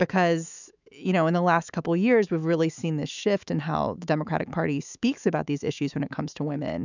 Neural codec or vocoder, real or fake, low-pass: autoencoder, 48 kHz, 128 numbers a frame, DAC-VAE, trained on Japanese speech; fake; 7.2 kHz